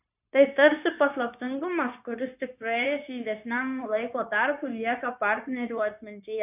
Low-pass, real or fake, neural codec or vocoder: 3.6 kHz; fake; codec, 16 kHz, 0.9 kbps, LongCat-Audio-Codec